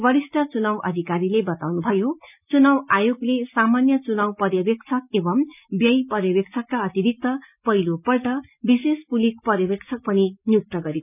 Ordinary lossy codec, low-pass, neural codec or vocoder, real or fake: none; 3.6 kHz; none; real